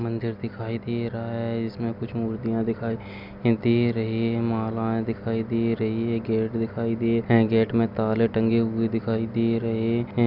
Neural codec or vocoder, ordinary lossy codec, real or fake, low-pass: none; none; real; 5.4 kHz